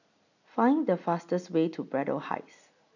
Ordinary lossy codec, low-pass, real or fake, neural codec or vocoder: none; 7.2 kHz; real; none